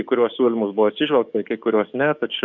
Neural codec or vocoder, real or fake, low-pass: codec, 44.1 kHz, 7.8 kbps, DAC; fake; 7.2 kHz